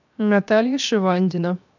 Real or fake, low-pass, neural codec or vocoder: fake; 7.2 kHz; codec, 16 kHz, 0.7 kbps, FocalCodec